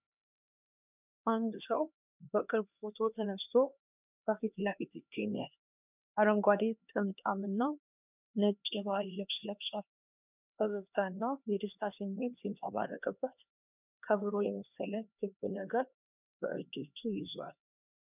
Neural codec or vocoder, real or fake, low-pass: codec, 16 kHz, 2 kbps, X-Codec, HuBERT features, trained on LibriSpeech; fake; 3.6 kHz